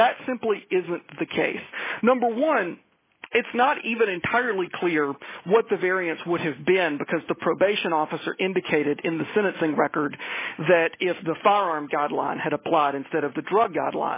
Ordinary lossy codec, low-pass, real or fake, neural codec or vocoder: MP3, 16 kbps; 3.6 kHz; real; none